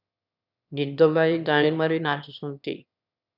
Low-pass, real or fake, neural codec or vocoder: 5.4 kHz; fake; autoencoder, 22.05 kHz, a latent of 192 numbers a frame, VITS, trained on one speaker